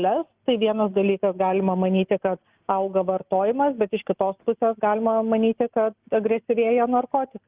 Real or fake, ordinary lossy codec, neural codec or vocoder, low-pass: real; Opus, 32 kbps; none; 3.6 kHz